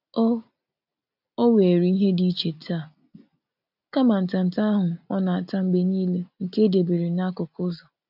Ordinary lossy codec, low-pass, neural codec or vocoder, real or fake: none; 5.4 kHz; none; real